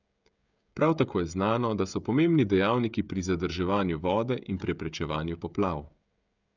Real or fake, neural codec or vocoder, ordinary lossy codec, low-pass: fake; codec, 16 kHz, 16 kbps, FreqCodec, smaller model; none; 7.2 kHz